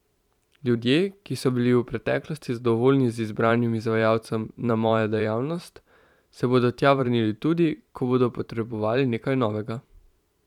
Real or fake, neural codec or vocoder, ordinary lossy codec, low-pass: fake; vocoder, 44.1 kHz, 128 mel bands every 512 samples, BigVGAN v2; none; 19.8 kHz